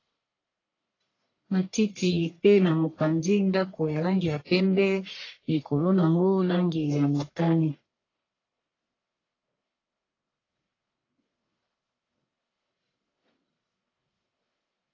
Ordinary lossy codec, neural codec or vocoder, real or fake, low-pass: AAC, 32 kbps; codec, 44.1 kHz, 1.7 kbps, Pupu-Codec; fake; 7.2 kHz